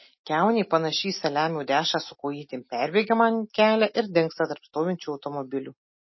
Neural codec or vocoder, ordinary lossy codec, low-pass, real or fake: none; MP3, 24 kbps; 7.2 kHz; real